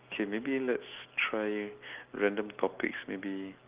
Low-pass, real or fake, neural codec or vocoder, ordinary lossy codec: 3.6 kHz; real; none; Opus, 32 kbps